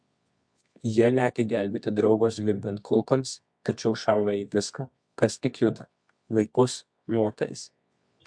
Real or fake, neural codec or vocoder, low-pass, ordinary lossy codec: fake; codec, 24 kHz, 0.9 kbps, WavTokenizer, medium music audio release; 9.9 kHz; MP3, 64 kbps